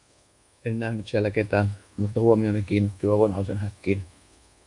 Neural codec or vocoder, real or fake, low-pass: codec, 24 kHz, 1.2 kbps, DualCodec; fake; 10.8 kHz